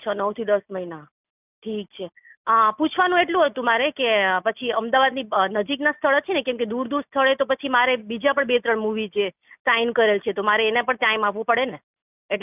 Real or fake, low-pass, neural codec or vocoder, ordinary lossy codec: real; 3.6 kHz; none; none